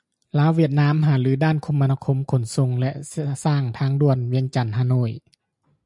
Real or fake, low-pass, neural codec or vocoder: real; 10.8 kHz; none